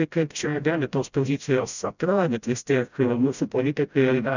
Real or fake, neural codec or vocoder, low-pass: fake; codec, 16 kHz, 0.5 kbps, FreqCodec, smaller model; 7.2 kHz